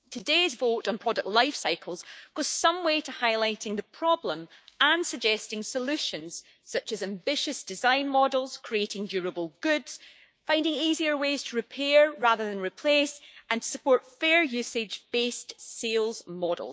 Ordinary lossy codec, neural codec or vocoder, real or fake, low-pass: none; codec, 16 kHz, 6 kbps, DAC; fake; none